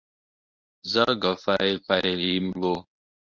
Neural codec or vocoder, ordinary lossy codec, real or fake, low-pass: codec, 24 kHz, 0.9 kbps, WavTokenizer, medium speech release version 1; Opus, 64 kbps; fake; 7.2 kHz